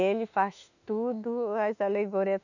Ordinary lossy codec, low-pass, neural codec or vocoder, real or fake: none; 7.2 kHz; autoencoder, 48 kHz, 32 numbers a frame, DAC-VAE, trained on Japanese speech; fake